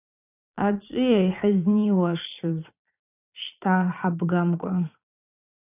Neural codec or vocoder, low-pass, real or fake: vocoder, 22.05 kHz, 80 mel bands, Vocos; 3.6 kHz; fake